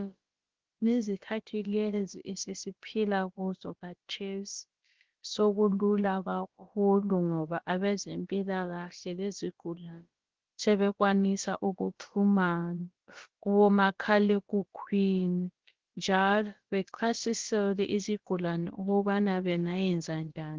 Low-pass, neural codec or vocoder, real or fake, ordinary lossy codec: 7.2 kHz; codec, 16 kHz, about 1 kbps, DyCAST, with the encoder's durations; fake; Opus, 16 kbps